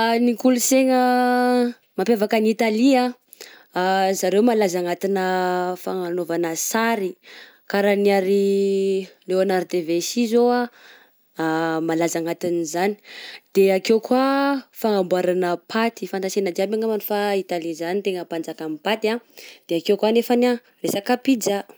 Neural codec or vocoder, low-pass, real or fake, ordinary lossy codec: none; none; real; none